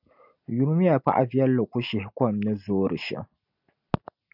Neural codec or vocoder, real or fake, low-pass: none; real; 5.4 kHz